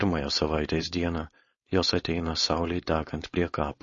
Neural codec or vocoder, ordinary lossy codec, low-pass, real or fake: codec, 16 kHz, 4.8 kbps, FACodec; MP3, 32 kbps; 7.2 kHz; fake